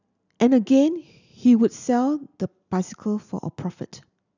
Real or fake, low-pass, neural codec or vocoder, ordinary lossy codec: real; 7.2 kHz; none; none